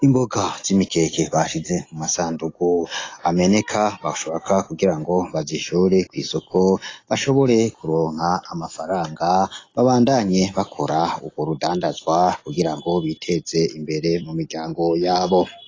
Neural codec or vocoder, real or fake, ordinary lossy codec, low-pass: none; real; AAC, 32 kbps; 7.2 kHz